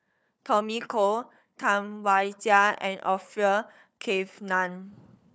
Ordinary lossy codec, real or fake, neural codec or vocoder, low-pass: none; fake; codec, 16 kHz, 4 kbps, FunCodec, trained on Chinese and English, 50 frames a second; none